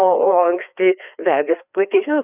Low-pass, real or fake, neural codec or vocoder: 3.6 kHz; fake; codec, 16 kHz, 2 kbps, FreqCodec, larger model